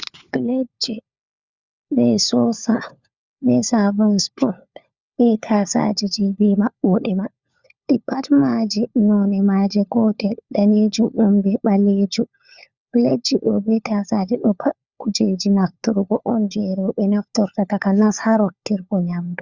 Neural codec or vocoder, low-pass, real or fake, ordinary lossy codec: codec, 16 kHz, 4 kbps, FunCodec, trained on LibriTTS, 50 frames a second; 7.2 kHz; fake; Opus, 64 kbps